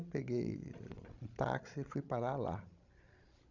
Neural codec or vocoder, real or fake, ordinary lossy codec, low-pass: codec, 16 kHz, 16 kbps, FreqCodec, larger model; fake; none; 7.2 kHz